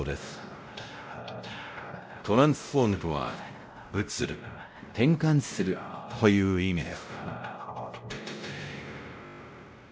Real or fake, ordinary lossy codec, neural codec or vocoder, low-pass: fake; none; codec, 16 kHz, 0.5 kbps, X-Codec, WavLM features, trained on Multilingual LibriSpeech; none